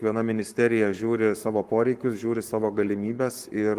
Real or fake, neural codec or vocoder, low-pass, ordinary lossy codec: fake; codec, 44.1 kHz, 7.8 kbps, Pupu-Codec; 14.4 kHz; Opus, 24 kbps